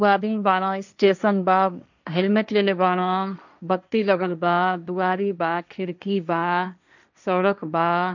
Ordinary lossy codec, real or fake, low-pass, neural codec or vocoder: none; fake; 7.2 kHz; codec, 16 kHz, 1.1 kbps, Voila-Tokenizer